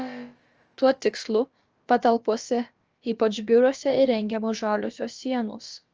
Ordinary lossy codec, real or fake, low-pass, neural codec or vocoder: Opus, 24 kbps; fake; 7.2 kHz; codec, 16 kHz, about 1 kbps, DyCAST, with the encoder's durations